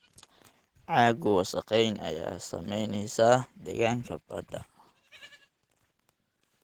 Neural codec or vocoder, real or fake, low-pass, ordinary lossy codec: none; real; 19.8 kHz; Opus, 16 kbps